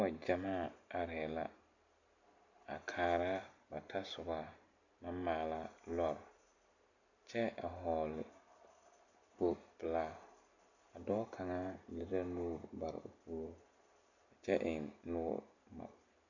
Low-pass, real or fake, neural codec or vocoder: 7.2 kHz; real; none